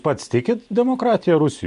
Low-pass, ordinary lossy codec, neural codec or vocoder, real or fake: 10.8 kHz; MP3, 96 kbps; none; real